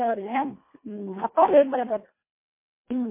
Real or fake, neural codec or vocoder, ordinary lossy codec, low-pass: fake; codec, 24 kHz, 1.5 kbps, HILCodec; MP3, 24 kbps; 3.6 kHz